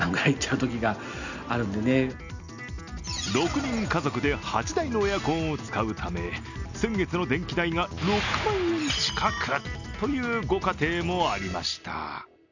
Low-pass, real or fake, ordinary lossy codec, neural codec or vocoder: 7.2 kHz; real; none; none